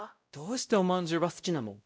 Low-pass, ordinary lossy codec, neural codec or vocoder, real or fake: none; none; codec, 16 kHz, 0.5 kbps, X-Codec, WavLM features, trained on Multilingual LibriSpeech; fake